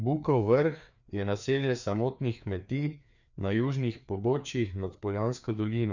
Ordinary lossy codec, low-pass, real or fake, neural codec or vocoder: none; 7.2 kHz; fake; codec, 16 kHz, 2 kbps, FreqCodec, larger model